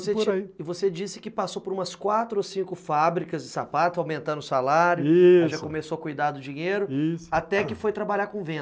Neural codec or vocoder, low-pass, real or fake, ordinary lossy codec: none; none; real; none